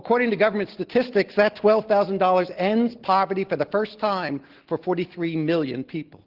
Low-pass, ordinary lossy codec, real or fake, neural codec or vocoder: 5.4 kHz; Opus, 16 kbps; real; none